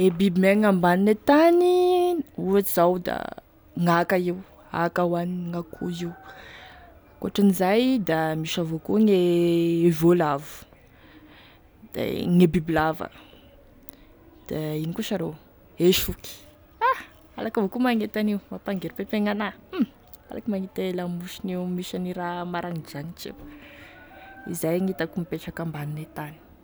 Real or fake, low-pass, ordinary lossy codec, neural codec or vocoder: real; none; none; none